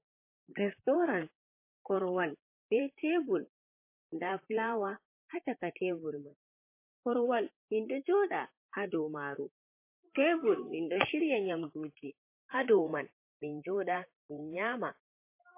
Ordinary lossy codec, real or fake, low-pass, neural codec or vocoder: MP3, 24 kbps; fake; 3.6 kHz; vocoder, 44.1 kHz, 128 mel bands, Pupu-Vocoder